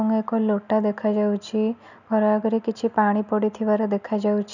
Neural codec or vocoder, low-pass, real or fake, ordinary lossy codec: none; 7.2 kHz; real; none